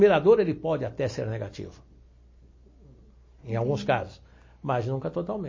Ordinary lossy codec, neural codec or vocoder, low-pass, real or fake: MP3, 32 kbps; none; 7.2 kHz; real